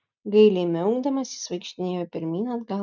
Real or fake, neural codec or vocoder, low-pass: real; none; 7.2 kHz